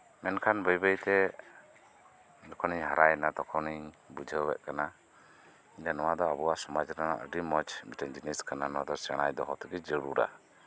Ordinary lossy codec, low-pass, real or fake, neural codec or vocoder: none; none; real; none